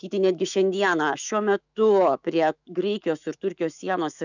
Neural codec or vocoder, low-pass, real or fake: autoencoder, 48 kHz, 128 numbers a frame, DAC-VAE, trained on Japanese speech; 7.2 kHz; fake